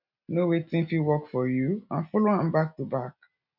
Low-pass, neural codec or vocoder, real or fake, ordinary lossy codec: 5.4 kHz; none; real; none